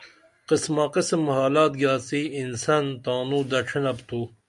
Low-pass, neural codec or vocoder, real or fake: 10.8 kHz; none; real